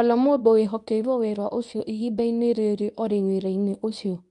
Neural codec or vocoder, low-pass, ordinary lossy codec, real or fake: codec, 24 kHz, 0.9 kbps, WavTokenizer, medium speech release version 1; 10.8 kHz; none; fake